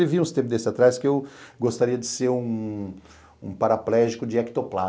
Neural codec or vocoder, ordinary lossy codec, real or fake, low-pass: none; none; real; none